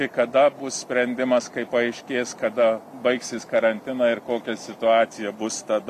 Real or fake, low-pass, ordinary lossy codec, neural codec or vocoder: real; 14.4 kHz; MP3, 64 kbps; none